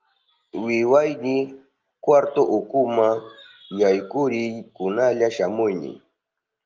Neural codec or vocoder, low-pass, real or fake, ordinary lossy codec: none; 7.2 kHz; real; Opus, 32 kbps